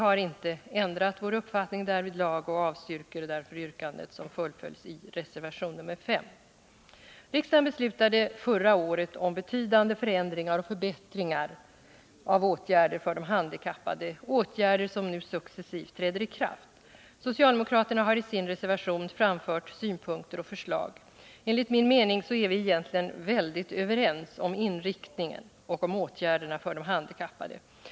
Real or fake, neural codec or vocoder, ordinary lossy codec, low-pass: real; none; none; none